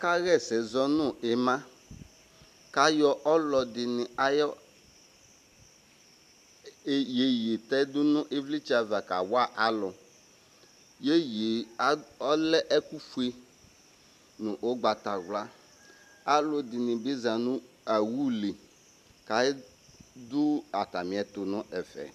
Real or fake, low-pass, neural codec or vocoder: real; 14.4 kHz; none